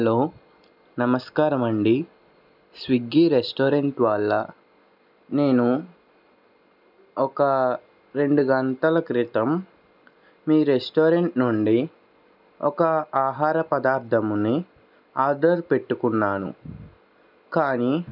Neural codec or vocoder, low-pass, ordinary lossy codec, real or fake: none; 5.4 kHz; none; real